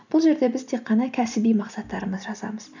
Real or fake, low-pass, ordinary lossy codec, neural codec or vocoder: real; 7.2 kHz; none; none